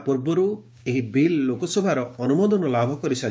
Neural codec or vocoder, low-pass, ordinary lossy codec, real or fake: codec, 16 kHz, 6 kbps, DAC; none; none; fake